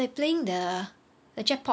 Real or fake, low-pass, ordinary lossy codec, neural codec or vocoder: real; none; none; none